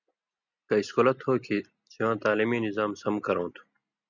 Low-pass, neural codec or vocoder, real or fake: 7.2 kHz; none; real